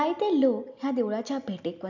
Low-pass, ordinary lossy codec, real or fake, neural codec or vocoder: 7.2 kHz; none; real; none